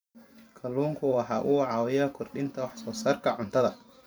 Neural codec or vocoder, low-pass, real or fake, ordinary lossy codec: vocoder, 44.1 kHz, 128 mel bands every 512 samples, BigVGAN v2; none; fake; none